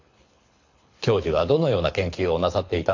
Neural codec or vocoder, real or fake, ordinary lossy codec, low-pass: codec, 24 kHz, 6 kbps, HILCodec; fake; MP3, 48 kbps; 7.2 kHz